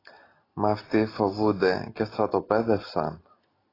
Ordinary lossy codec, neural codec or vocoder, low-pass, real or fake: AAC, 24 kbps; none; 5.4 kHz; real